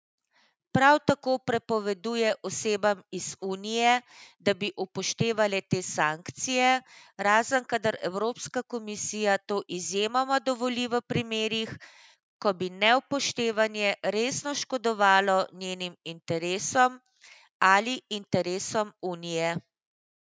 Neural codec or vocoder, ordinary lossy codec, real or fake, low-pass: none; none; real; none